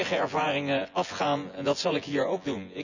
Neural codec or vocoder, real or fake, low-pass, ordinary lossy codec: vocoder, 24 kHz, 100 mel bands, Vocos; fake; 7.2 kHz; none